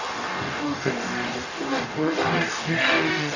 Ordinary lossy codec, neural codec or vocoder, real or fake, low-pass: none; codec, 44.1 kHz, 0.9 kbps, DAC; fake; 7.2 kHz